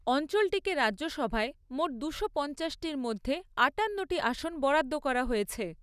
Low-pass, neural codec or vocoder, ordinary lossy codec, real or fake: 14.4 kHz; none; none; real